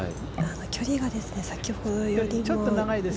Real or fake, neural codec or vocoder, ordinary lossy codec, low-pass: real; none; none; none